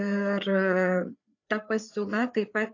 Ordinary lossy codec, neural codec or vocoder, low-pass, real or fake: AAC, 48 kbps; codec, 16 kHz, 4 kbps, FreqCodec, larger model; 7.2 kHz; fake